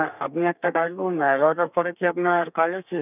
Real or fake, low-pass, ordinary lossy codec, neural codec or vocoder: fake; 3.6 kHz; none; codec, 32 kHz, 1.9 kbps, SNAC